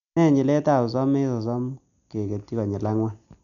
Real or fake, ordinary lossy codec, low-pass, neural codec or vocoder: real; none; 7.2 kHz; none